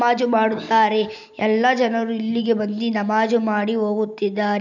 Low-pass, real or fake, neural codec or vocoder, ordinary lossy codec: 7.2 kHz; real; none; none